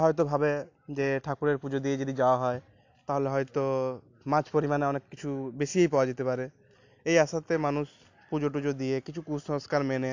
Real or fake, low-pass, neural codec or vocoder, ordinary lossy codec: real; 7.2 kHz; none; AAC, 48 kbps